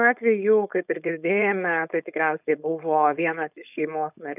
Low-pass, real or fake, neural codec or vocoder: 3.6 kHz; fake; codec, 16 kHz, 4.8 kbps, FACodec